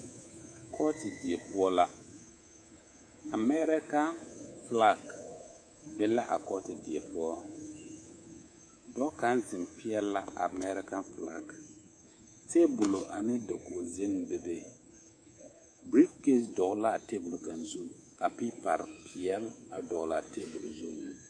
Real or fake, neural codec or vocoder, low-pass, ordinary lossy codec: fake; codec, 24 kHz, 3.1 kbps, DualCodec; 9.9 kHz; AAC, 48 kbps